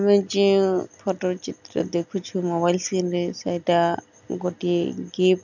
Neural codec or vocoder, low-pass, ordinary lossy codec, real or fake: none; 7.2 kHz; none; real